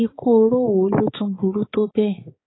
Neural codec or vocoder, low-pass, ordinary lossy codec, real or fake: codec, 16 kHz, 4 kbps, X-Codec, HuBERT features, trained on balanced general audio; 7.2 kHz; AAC, 16 kbps; fake